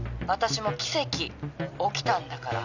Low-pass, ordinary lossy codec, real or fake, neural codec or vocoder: 7.2 kHz; none; real; none